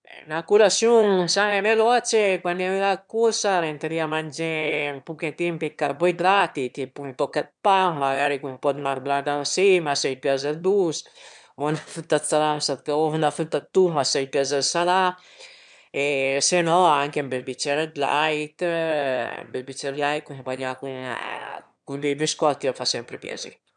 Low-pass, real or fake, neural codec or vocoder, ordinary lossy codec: 9.9 kHz; fake; autoencoder, 22.05 kHz, a latent of 192 numbers a frame, VITS, trained on one speaker; MP3, 96 kbps